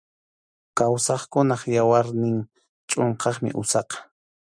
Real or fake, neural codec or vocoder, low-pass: real; none; 9.9 kHz